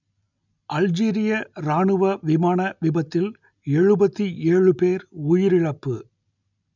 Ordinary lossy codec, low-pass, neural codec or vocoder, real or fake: none; 7.2 kHz; none; real